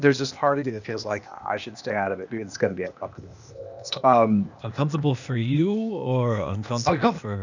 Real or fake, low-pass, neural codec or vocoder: fake; 7.2 kHz; codec, 16 kHz, 0.8 kbps, ZipCodec